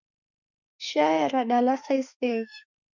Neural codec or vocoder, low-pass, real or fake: autoencoder, 48 kHz, 32 numbers a frame, DAC-VAE, trained on Japanese speech; 7.2 kHz; fake